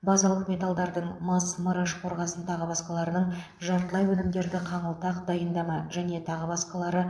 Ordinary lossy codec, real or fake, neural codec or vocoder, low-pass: none; fake; vocoder, 22.05 kHz, 80 mel bands, Vocos; none